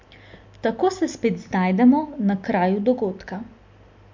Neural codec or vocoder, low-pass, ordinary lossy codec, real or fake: none; 7.2 kHz; MP3, 48 kbps; real